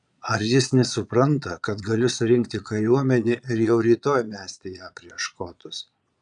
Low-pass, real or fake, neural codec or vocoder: 9.9 kHz; fake; vocoder, 22.05 kHz, 80 mel bands, Vocos